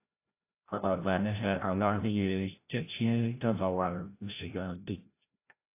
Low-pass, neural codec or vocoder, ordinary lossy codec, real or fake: 3.6 kHz; codec, 16 kHz, 0.5 kbps, FreqCodec, larger model; AAC, 24 kbps; fake